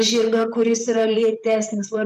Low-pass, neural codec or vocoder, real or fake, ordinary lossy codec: 14.4 kHz; vocoder, 44.1 kHz, 128 mel bands, Pupu-Vocoder; fake; MP3, 96 kbps